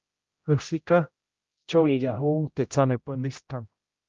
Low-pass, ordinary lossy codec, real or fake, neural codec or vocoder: 7.2 kHz; Opus, 32 kbps; fake; codec, 16 kHz, 0.5 kbps, X-Codec, HuBERT features, trained on general audio